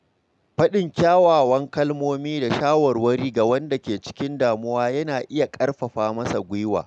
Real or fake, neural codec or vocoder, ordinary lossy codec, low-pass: real; none; none; none